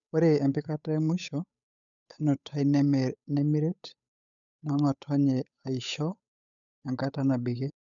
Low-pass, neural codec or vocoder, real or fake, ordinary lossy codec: 7.2 kHz; codec, 16 kHz, 8 kbps, FunCodec, trained on Chinese and English, 25 frames a second; fake; none